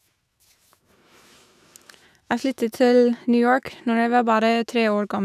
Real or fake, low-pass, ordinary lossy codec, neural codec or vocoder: fake; 14.4 kHz; none; autoencoder, 48 kHz, 128 numbers a frame, DAC-VAE, trained on Japanese speech